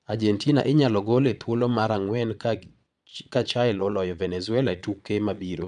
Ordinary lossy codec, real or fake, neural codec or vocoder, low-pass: MP3, 96 kbps; fake; vocoder, 22.05 kHz, 80 mel bands, Vocos; 9.9 kHz